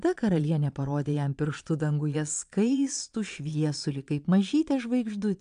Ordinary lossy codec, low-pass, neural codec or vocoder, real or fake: AAC, 96 kbps; 9.9 kHz; vocoder, 22.05 kHz, 80 mel bands, Vocos; fake